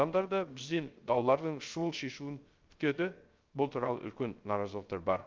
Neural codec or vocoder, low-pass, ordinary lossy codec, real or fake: codec, 16 kHz, 0.3 kbps, FocalCodec; 7.2 kHz; Opus, 24 kbps; fake